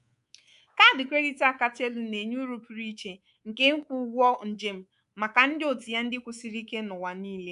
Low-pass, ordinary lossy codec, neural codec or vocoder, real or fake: 10.8 kHz; none; codec, 24 kHz, 3.1 kbps, DualCodec; fake